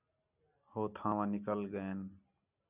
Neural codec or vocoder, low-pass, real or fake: none; 3.6 kHz; real